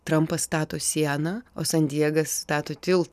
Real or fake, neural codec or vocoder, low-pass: real; none; 14.4 kHz